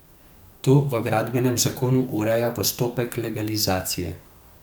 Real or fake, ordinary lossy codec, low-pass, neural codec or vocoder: fake; none; none; codec, 44.1 kHz, 2.6 kbps, SNAC